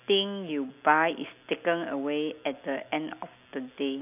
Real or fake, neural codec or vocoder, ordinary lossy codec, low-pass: real; none; none; 3.6 kHz